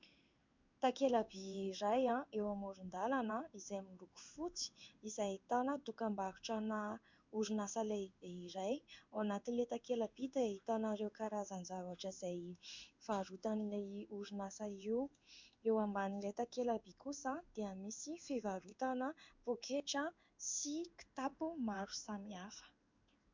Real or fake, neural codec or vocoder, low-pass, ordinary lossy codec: fake; codec, 16 kHz in and 24 kHz out, 1 kbps, XY-Tokenizer; 7.2 kHz; MP3, 64 kbps